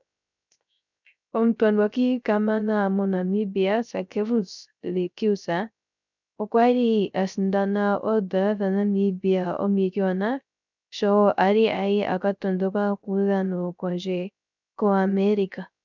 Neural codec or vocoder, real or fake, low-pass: codec, 16 kHz, 0.3 kbps, FocalCodec; fake; 7.2 kHz